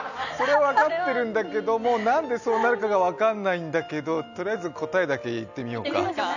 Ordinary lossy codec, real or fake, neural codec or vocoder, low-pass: none; real; none; 7.2 kHz